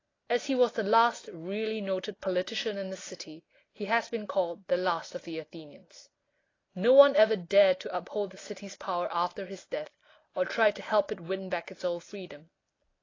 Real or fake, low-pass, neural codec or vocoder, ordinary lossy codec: real; 7.2 kHz; none; AAC, 32 kbps